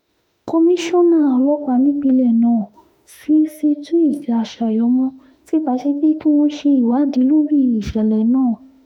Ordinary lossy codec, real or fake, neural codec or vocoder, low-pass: none; fake; autoencoder, 48 kHz, 32 numbers a frame, DAC-VAE, trained on Japanese speech; 19.8 kHz